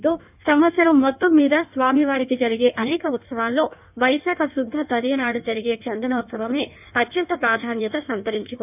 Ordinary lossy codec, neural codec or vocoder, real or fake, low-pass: none; codec, 16 kHz in and 24 kHz out, 1.1 kbps, FireRedTTS-2 codec; fake; 3.6 kHz